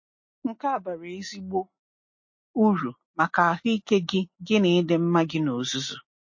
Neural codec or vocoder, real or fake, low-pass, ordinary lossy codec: none; real; 7.2 kHz; MP3, 32 kbps